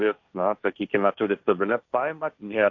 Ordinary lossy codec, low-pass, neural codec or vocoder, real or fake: AAC, 48 kbps; 7.2 kHz; codec, 16 kHz, 1.1 kbps, Voila-Tokenizer; fake